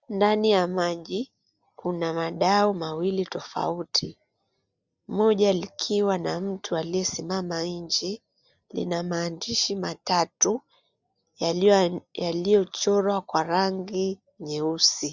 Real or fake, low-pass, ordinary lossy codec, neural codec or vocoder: real; 7.2 kHz; Opus, 64 kbps; none